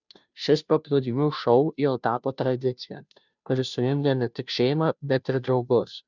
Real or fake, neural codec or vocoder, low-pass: fake; codec, 16 kHz, 0.5 kbps, FunCodec, trained on Chinese and English, 25 frames a second; 7.2 kHz